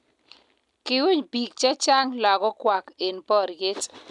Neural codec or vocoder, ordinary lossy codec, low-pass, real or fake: none; none; 10.8 kHz; real